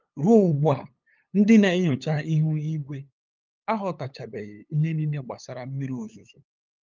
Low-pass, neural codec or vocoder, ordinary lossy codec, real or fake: 7.2 kHz; codec, 16 kHz, 8 kbps, FunCodec, trained on LibriTTS, 25 frames a second; Opus, 24 kbps; fake